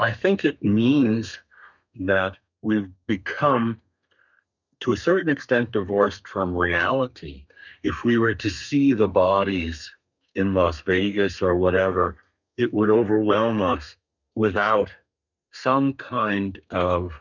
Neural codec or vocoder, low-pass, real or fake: codec, 32 kHz, 1.9 kbps, SNAC; 7.2 kHz; fake